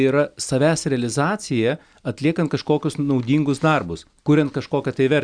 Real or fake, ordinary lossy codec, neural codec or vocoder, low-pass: real; Opus, 64 kbps; none; 9.9 kHz